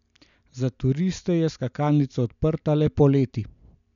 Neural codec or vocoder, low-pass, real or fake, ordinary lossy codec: none; 7.2 kHz; real; none